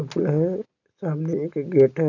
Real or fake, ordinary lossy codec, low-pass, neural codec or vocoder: real; none; 7.2 kHz; none